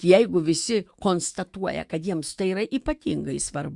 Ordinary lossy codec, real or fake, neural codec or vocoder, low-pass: Opus, 64 kbps; fake; autoencoder, 48 kHz, 128 numbers a frame, DAC-VAE, trained on Japanese speech; 10.8 kHz